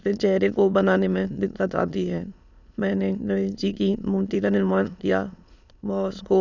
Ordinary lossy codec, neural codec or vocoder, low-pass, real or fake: none; autoencoder, 22.05 kHz, a latent of 192 numbers a frame, VITS, trained on many speakers; 7.2 kHz; fake